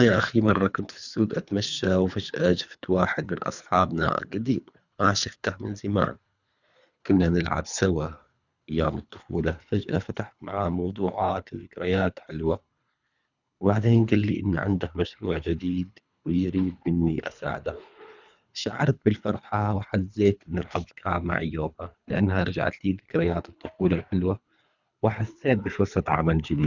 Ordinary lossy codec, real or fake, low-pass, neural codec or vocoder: none; fake; 7.2 kHz; codec, 24 kHz, 3 kbps, HILCodec